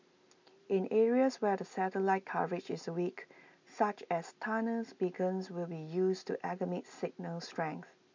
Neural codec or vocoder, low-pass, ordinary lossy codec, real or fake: none; 7.2 kHz; MP3, 64 kbps; real